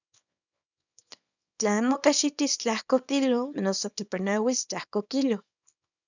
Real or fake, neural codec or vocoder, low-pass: fake; codec, 24 kHz, 0.9 kbps, WavTokenizer, small release; 7.2 kHz